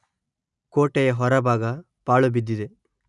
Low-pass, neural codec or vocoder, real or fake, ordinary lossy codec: 10.8 kHz; none; real; none